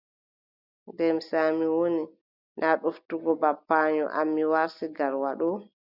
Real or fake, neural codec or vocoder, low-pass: real; none; 5.4 kHz